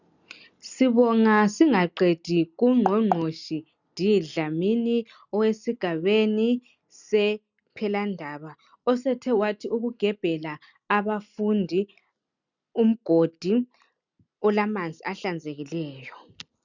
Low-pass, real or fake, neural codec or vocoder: 7.2 kHz; real; none